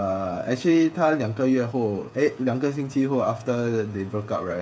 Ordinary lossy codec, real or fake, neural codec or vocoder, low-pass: none; fake; codec, 16 kHz, 8 kbps, FreqCodec, smaller model; none